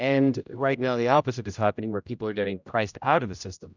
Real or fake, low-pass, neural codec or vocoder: fake; 7.2 kHz; codec, 16 kHz, 0.5 kbps, X-Codec, HuBERT features, trained on general audio